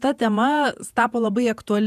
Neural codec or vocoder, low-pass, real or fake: vocoder, 48 kHz, 128 mel bands, Vocos; 14.4 kHz; fake